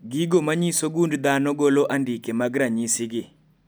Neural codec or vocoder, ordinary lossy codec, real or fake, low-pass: none; none; real; none